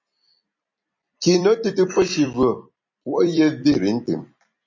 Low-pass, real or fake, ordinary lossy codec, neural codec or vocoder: 7.2 kHz; fake; MP3, 32 kbps; vocoder, 44.1 kHz, 128 mel bands every 256 samples, BigVGAN v2